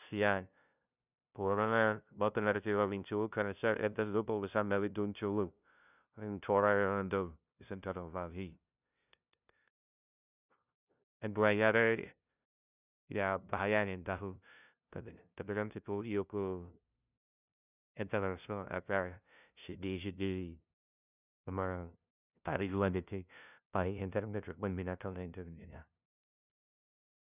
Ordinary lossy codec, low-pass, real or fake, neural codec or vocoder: none; 3.6 kHz; fake; codec, 16 kHz, 0.5 kbps, FunCodec, trained on LibriTTS, 25 frames a second